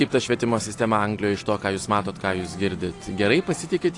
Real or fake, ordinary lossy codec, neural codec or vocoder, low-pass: real; AAC, 48 kbps; none; 10.8 kHz